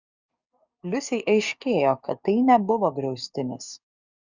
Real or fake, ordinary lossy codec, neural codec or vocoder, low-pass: fake; Opus, 64 kbps; codec, 44.1 kHz, 7.8 kbps, DAC; 7.2 kHz